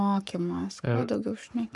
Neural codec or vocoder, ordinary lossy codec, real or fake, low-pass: codec, 44.1 kHz, 7.8 kbps, Pupu-Codec; AAC, 64 kbps; fake; 10.8 kHz